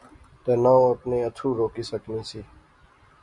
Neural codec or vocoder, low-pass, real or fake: none; 10.8 kHz; real